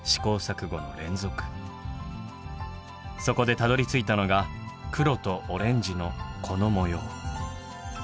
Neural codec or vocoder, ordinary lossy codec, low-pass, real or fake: none; none; none; real